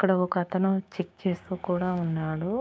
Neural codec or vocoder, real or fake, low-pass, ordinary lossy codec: codec, 16 kHz, 6 kbps, DAC; fake; none; none